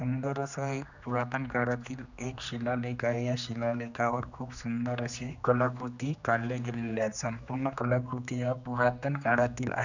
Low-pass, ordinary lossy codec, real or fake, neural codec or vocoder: 7.2 kHz; none; fake; codec, 16 kHz, 2 kbps, X-Codec, HuBERT features, trained on general audio